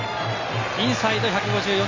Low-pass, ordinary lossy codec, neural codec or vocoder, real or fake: 7.2 kHz; MP3, 48 kbps; none; real